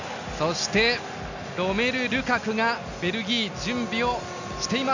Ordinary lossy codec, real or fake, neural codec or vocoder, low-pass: none; real; none; 7.2 kHz